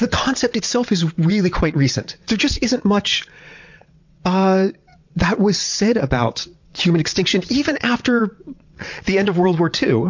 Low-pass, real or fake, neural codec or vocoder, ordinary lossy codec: 7.2 kHz; fake; vocoder, 22.05 kHz, 80 mel bands, WaveNeXt; MP3, 48 kbps